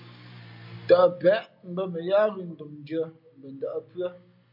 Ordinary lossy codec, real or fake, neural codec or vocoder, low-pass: MP3, 48 kbps; real; none; 5.4 kHz